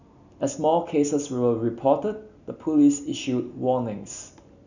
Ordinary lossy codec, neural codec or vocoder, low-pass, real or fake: none; none; 7.2 kHz; real